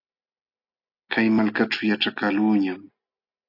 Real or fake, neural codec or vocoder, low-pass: real; none; 5.4 kHz